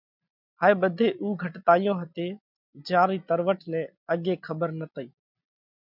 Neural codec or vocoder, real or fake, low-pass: none; real; 5.4 kHz